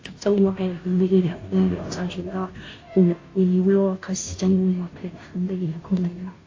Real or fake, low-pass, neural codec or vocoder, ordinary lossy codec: fake; 7.2 kHz; codec, 16 kHz, 0.5 kbps, FunCodec, trained on Chinese and English, 25 frames a second; AAC, 32 kbps